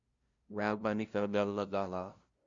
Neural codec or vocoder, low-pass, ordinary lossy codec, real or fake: codec, 16 kHz, 0.5 kbps, FunCodec, trained on LibriTTS, 25 frames a second; 7.2 kHz; Opus, 64 kbps; fake